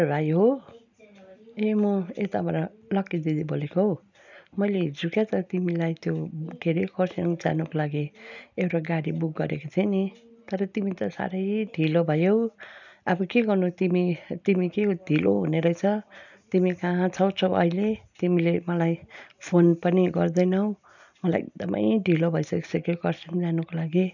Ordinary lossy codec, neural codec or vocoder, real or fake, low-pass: none; none; real; 7.2 kHz